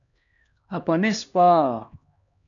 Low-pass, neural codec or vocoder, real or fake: 7.2 kHz; codec, 16 kHz, 1 kbps, X-Codec, HuBERT features, trained on LibriSpeech; fake